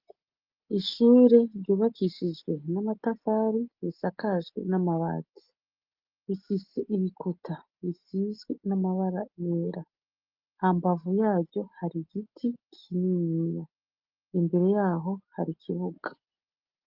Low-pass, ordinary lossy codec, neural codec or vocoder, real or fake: 5.4 kHz; Opus, 24 kbps; none; real